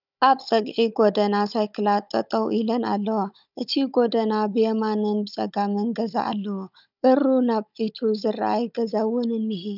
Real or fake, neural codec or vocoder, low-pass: fake; codec, 16 kHz, 16 kbps, FunCodec, trained on Chinese and English, 50 frames a second; 5.4 kHz